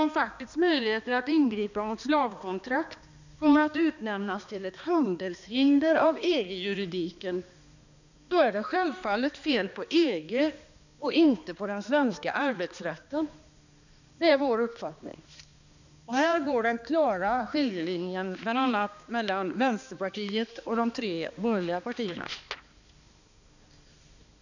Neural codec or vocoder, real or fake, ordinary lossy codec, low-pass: codec, 16 kHz, 2 kbps, X-Codec, HuBERT features, trained on balanced general audio; fake; none; 7.2 kHz